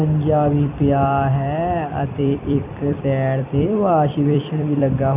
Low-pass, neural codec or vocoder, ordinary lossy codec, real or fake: 3.6 kHz; vocoder, 44.1 kHz, 128 mel bands every 256 samples, BigVGAN v2; none; fake